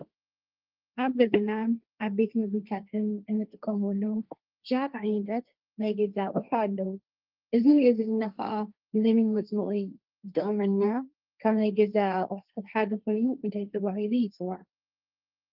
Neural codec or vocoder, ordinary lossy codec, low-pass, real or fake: codec, 16 kHz, 1.1 kbps, Voila-Tokenizer; Opus, 24 kbps; 5.4 kHz; fake